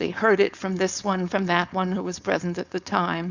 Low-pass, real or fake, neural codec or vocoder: 7.2 kHz; fake; codec, 16 kHz, 4.8 kbps, FACodec